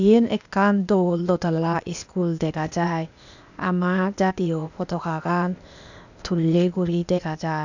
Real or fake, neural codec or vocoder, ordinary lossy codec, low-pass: fake; codec, 16 kHz, 0.8 kbps, ZipCodec; none; 7.2 kHz